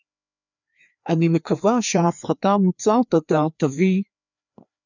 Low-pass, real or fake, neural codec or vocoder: 7.2 kHz; fake; codec, 16 kHz, 2 kbps, FreqCodec, larger model